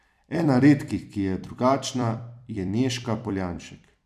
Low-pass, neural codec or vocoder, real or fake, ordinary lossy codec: 14.4 kHz; vocoder, 44.1 kHz, 128 mel bands every 256 samples, BigVGAN v2; fake; none